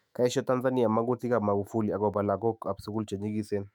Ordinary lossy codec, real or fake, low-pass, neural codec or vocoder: MP3, 96 kbps; fake; 19.8 kHz; autoencoder, 48 kHz, 128 numbers a frame, DAC-VAE, trained on Japanese speech